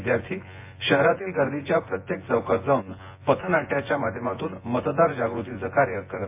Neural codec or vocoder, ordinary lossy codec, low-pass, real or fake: vocoder, 24 kHz, 100 mel bands, Vocos; MP3, 24 kbps; 3.6 kHz; fake